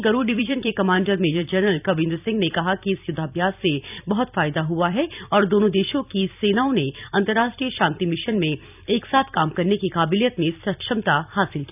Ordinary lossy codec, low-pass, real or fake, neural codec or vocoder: none; 3.6 kHz; fake; vocoder, 44.1 kHz, 128 mel bands every 256 samples, BigVGAN v2